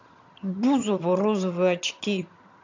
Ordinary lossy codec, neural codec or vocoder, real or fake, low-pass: MP3, 64 kbps; vocoder, 22.05 kHz, 80 mel bands, HiFi-GAN; fake; 7.2 kHz